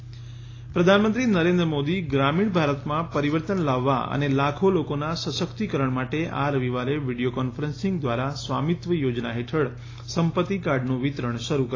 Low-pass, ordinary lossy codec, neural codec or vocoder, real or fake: 7.2 kHz; AAC, 32 kbps; none; real